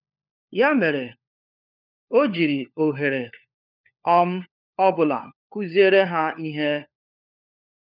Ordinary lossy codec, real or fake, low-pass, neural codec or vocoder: none; fake; 5.4 kHz; codec, 16 kHz, 4 kbps, FunCodec, trained on LibriTTS, 50 frames a second